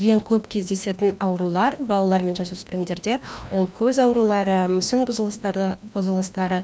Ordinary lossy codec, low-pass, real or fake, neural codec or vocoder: none; none; fake; codec, 16 kHz, 1 kbps, FreqCodec, larger model